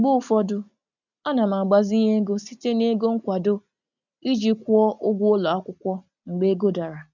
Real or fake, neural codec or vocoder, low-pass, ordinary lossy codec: real; none; 7.2 kHz; none